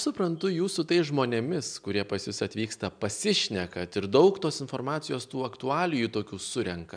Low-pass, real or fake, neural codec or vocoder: 9.9 kHz; real; none